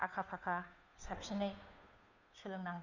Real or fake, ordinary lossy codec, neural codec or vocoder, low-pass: fake; none; codec, 16 kHz, 2 kbps, FunCodec, trained on Chinese and English, 25 frames a second; 7.2 kHz